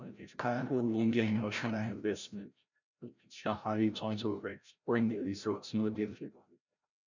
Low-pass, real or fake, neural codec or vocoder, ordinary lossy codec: 7.2 kHz; fake; codec, 16 kHz, 0.5 kbps, FreqCodec, larger model; none